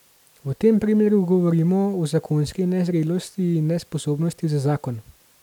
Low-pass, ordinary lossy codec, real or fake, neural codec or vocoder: 19.8 kHz; none; real; none